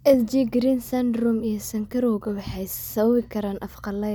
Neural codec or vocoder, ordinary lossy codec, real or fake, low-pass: none; none; real; none